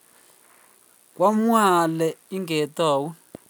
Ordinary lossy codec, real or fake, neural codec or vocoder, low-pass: none; real; none; none